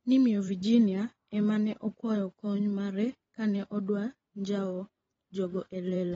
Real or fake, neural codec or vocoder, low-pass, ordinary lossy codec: fake; vocoder, 44.1 kHz, 128 mel bands every 512 samples, BigVGAN v2; 19.8 kHz; AAC, 24 kbps